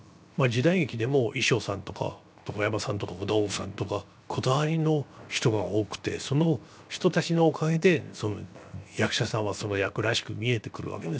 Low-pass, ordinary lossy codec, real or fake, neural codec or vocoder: none; none; fake; codec, 16 kHz, 0.7 kbps, FocalCodec